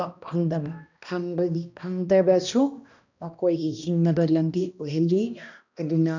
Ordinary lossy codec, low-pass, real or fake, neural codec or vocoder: none; 7.2 kHz; fake; codec, 16 kHz, 1 kbps, X-Codec, HuBERT features, trained on balanced general audio